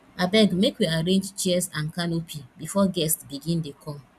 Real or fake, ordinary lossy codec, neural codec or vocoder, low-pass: real; none; none; 14.4 kHz